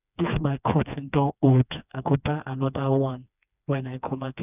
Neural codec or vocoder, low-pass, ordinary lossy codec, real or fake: codec, 16 kHz, 2 kbps, FreqCodec, smaller model; 3.6 kHz; none; fake